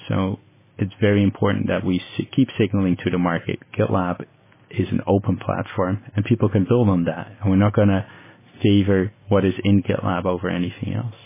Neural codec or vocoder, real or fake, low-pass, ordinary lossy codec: none; real; 3.6 kHz; MP3, 16 kbps